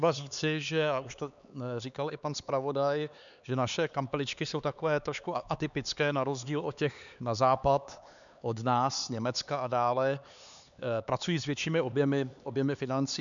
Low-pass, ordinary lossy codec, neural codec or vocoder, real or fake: 7.2 kHz; MP3, 96 kbps; codec, 16 kHz, 4 kbps, X-Codec, HuBERT features, trained on LibriSpeech; fake